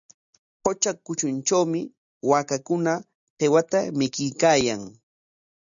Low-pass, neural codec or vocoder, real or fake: 7.2 kHz; none; real